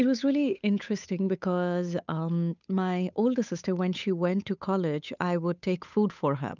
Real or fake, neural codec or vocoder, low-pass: fake; codec, 16 kHz, 8 kbps, FunCodec, trained on Chinese and English, 25 frames a second; 7.2 kHz